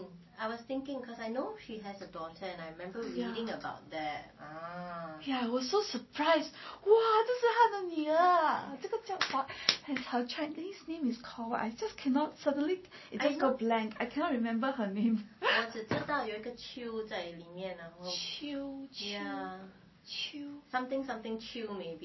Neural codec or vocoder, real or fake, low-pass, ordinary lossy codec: none; real; 7.2 kHz; MP3, 24 kbps